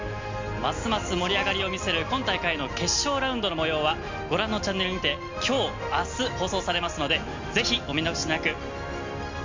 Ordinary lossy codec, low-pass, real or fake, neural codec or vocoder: MP3, 64 kbps; 7.2 kHz; real; none